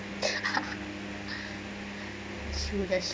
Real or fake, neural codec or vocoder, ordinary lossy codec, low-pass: real; none; none; none